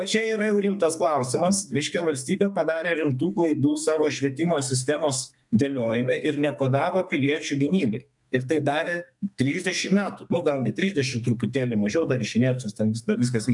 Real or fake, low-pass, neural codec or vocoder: fake; 10.8 kHz; codec, 32 kHz, 1.9 kbps, SNAC